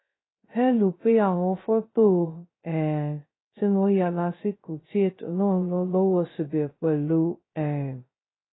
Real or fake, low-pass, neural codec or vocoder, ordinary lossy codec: fake; 7.2 kHz; codec, 16 kHz, 0.2 kbps, FocalCodec; AAC, 16 kbps